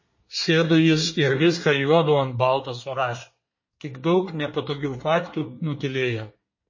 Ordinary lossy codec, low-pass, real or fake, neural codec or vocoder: MP3, 32 kbps; 7.2 kHz; fake; codec, 24 kHz, 1 kbps, SNAC